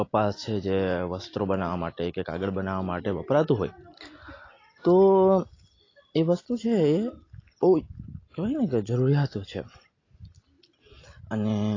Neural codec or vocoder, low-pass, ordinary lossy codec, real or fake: none; 7.2 kHz; AAC, 32 kbps; real